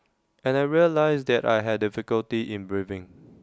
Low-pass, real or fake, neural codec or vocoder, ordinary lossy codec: none; real; none; none